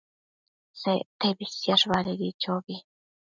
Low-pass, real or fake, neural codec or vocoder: 7.2 kHz; real; none